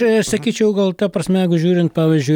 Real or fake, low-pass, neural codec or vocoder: real; 19.8 kHz; none